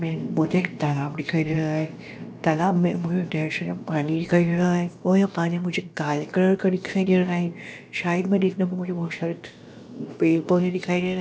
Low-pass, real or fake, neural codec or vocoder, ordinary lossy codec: none; fake; codec, 16 kHz, about 1 kbps, DyCAST, with the encoder's durations; none